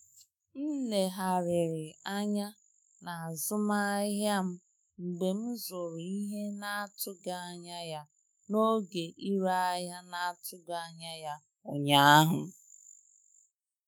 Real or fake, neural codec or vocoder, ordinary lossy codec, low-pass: fake; autoencoder, 48 kHz, 128 numbers a frame, DAC-VAE, trained on Japanese speech; none; none